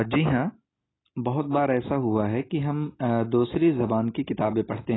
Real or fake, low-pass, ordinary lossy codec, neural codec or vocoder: real; 7.2 kHz; AAC, 16 kbps; none